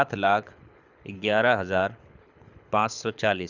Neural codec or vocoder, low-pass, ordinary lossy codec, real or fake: codec, 24 kHz, 6 kbps, HILCodec; 7.2 kHz; none; fake